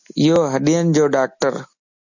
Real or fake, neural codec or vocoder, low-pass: real; none; 7.2 kHz